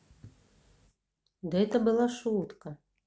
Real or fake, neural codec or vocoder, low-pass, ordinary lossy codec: real; none; none; none